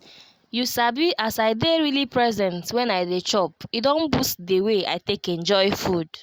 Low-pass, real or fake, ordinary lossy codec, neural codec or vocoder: 19.8 kHz; real; Opus, 64 kbps; none